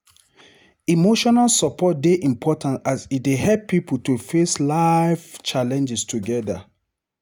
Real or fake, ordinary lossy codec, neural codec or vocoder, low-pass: real; none; none; 19.8 kHz